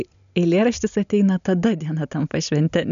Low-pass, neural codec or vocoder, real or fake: 7.2 kHz; none; real